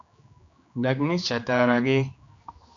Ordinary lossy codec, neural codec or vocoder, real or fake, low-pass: AAC, 64 kbps; codec, 16 kHz, 2 kbps, X-Codec, HuBERT features, trained on general audio; fake; 7.2 kHz